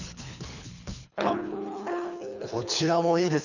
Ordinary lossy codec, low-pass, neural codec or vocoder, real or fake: none; 7.2 kHz; codec, 24 kHz, 3 kbps, HILCodec; fake